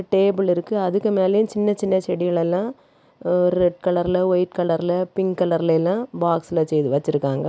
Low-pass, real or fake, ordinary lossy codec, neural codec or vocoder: none; real; none; none